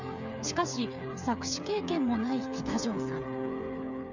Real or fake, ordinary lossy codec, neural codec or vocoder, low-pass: fake; none; codec, 16 kHz, 8 kbps, FreqCodec, smaller model; 7.2 kHz